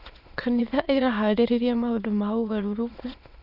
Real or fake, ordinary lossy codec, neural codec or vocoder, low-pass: fake; none; autoencoder, 22.05 kHz, a latent of 192 numbers a frame, VITS, trained on many speakers; 5.4 kHz